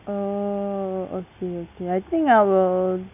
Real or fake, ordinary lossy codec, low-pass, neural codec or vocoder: real; none; 3.6 kHz; none